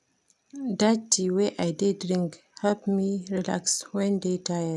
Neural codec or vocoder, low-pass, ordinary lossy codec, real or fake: none; none; none; real